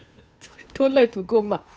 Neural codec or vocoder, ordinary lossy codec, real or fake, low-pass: codec, 16 kHz, 2 kbps, FunCodec, trained on Chinese and English, 25 frames a second; none; fake; none